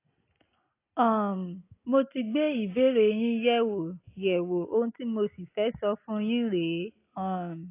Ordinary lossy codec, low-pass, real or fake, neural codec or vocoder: AAC, 24 kbps; 3.6 kHz; real; none